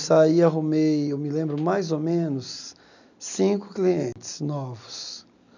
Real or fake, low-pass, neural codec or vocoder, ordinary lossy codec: real; 7.2 kHz; none; none